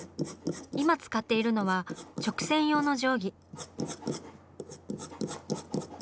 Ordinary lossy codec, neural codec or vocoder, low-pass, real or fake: none; none; none; real